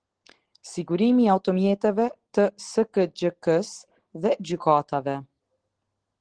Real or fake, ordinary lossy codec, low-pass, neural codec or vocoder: real; Opus, 16 kbps; 9.9 kHz; none